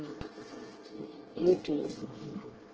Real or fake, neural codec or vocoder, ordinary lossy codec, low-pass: fake; codec, 24 kHz, 1 kbps, SNAC; Opus, 16 kbps; 7.2 kHz